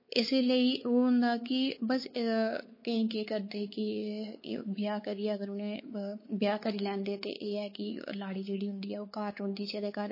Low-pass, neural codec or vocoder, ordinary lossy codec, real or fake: 5.4 kHz; codec, 16 kHz, 4 kbps, X-Codec, WavLM features, trained on Multilingual LibriSpeech; MP3, 24 kbps; fake